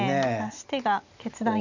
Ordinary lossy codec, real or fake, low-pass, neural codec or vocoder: none; real; 7.2 kHz; none